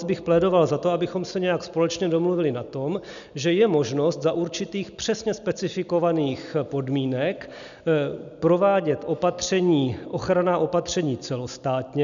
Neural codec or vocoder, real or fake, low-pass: none; real; 7.2 kHz